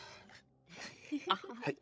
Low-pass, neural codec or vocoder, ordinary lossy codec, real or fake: none; codec, 16 kHz, 16 kbps, FreqCodec, larger model; none; fake